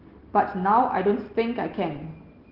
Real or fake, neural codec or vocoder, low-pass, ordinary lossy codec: real; none; 5.4 kHz; Opus, 16 kbps